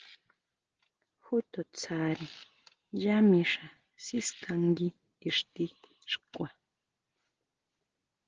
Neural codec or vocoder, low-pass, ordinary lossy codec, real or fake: none; 7.2 kHz; Opus, 16 kbps; real